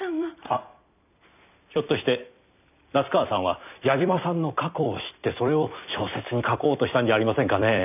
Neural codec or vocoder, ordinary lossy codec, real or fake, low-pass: vocoder, 44.1 kHz, 128 mel bands every 256 samples, BigVGAN v2; none; fake; 3.6 kHz